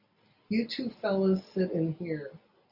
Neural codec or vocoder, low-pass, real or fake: none; 5.4 kHz; real